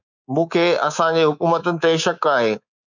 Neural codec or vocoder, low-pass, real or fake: codec, 24 kHz, 3.1 kbps, DualCodec; 7.2 kHz; fake